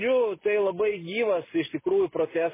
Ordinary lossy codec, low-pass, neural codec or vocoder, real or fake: MP3, 16 kbps; 3.6 kHz; none; real